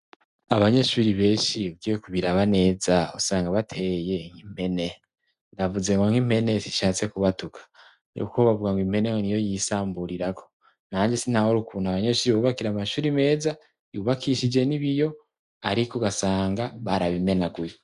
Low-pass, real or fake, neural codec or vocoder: 10.8 kHz; real; none